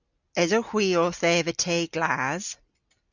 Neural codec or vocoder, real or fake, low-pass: none; real; 7.2 kHz